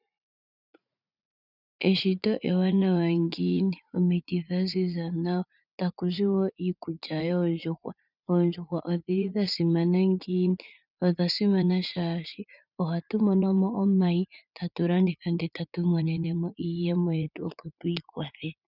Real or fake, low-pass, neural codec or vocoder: fake; 5.4 kHz; vocoder, 44.1 kHz, 80 mel bands, Vocos